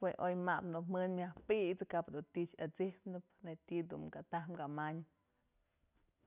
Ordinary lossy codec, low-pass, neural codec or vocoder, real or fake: none; 3.6 kHz; none; real